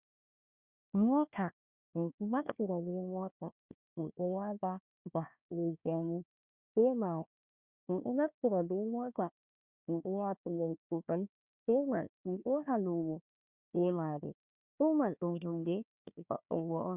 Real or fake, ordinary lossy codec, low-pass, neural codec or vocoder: fake; Opus, 64 kbps; 3.6 kHz; codec, 16 kHz, 1 kbps, FunCodec, trained on Chinese and English, 50 frames a second